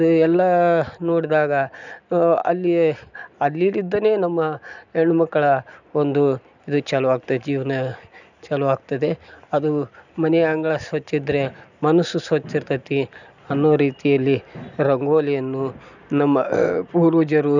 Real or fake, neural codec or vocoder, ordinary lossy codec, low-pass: real; none; none; 7.2 kHz